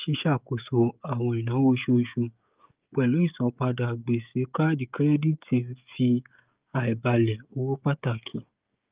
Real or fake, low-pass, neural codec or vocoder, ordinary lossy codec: fake; 3.6 kHz; codec, 16 kHz, 16 kbps, FreqCodec, smaller model; Opus, 32 kbps